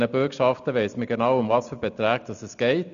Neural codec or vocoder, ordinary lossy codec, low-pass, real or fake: none; none; 7.2 kHz; real